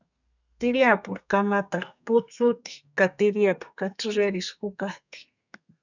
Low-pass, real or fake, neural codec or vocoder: 7.2 kHz; fake; codec, 32 kHz, 1.9 kbps, SNAC